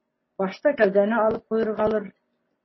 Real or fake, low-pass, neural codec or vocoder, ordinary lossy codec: real; 7.2 kHz; none; MP3, 24 kbps